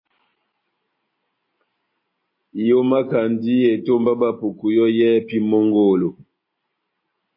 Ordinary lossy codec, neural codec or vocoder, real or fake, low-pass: MP3, 24 kbps; none; real; 5.4 kHz